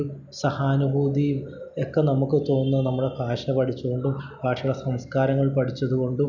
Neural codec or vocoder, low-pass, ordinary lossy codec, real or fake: none; 7.2 kHz; none; real